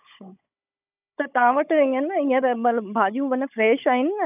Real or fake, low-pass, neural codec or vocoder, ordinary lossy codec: fake; 3.6 kHz; codec, 16 kHz, 16 kbps, FunCodec, trained on Chinese and English, 50 frames a second; none